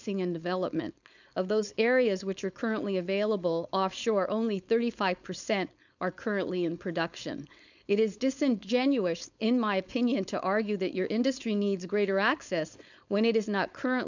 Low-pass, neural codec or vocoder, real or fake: 7.2 kHz; codec, 16 kHz, 4.8 kbps, FACodec; fake